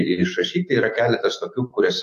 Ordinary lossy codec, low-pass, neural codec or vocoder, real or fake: AAC, 64 kbps; 14.4 kHz; autoencoder, 48 kHz, 128 numbers a frame, DAC-VAE, trained on Japanese speech; fake